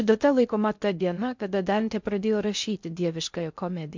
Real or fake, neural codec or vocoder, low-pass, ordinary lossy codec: fake; codec, 16 kHz in and 24 kHz out, 0.6 kbps, FocalCodec, streaming, 4096 codes; 7.2 kHz; MP3, 64 kbps